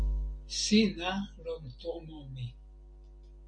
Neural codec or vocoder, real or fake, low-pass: none; real; 9.9 kHz